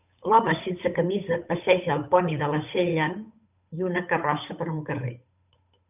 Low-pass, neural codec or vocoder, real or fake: 3.6 kHz; codec, 16 kHz, 8 kbps, FunCodec, trained on Chinese and English, 25 frames a second; fake